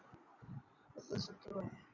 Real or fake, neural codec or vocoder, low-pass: fake; vocoder, 22.05 kHz, 80 mel bands, Vocos; 7.2 kHz